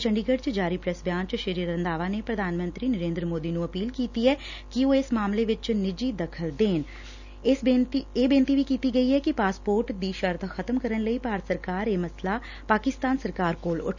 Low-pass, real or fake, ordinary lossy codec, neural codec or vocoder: 7.2 kHz; real; none; none